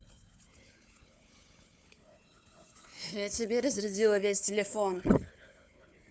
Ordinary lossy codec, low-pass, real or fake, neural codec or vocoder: none; none; fake; codec, 16 kHz, 4 kbps, FunCodec, trained on Chinese and English, 50 frames a second